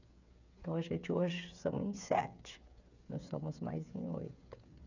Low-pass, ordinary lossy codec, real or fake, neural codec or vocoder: 7.2 kHz; none; fake; codec, 16 kHz, 8 kbps, FreqCodec, smaller model